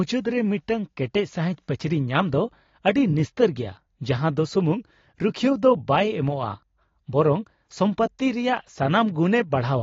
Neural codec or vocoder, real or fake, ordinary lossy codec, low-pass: none; real; AAC, 32 kbps; 7.2 kHz